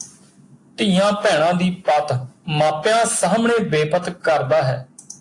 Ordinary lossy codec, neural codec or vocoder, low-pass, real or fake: AAC, 48 kbps; none; 10.8 kHz; real